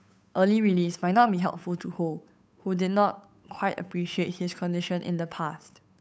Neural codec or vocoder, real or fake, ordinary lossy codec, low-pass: codec, 16 kHz, 8 kbps, FunCodec, trained on Chinese and English, 25 frames a second; fake; none; none